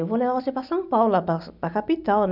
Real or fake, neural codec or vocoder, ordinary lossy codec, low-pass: real; none; none; 5.4 kHz